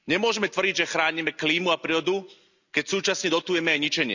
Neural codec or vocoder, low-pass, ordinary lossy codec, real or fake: none; 7.2 kHz; none; real